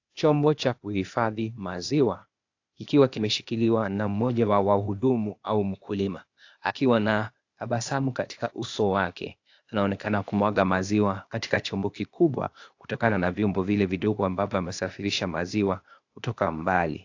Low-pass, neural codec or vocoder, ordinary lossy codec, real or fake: 7.2 kHz; codec, 16 kHz, 0.8 kbps, ZipCodec; AAC, 48 kbps; fake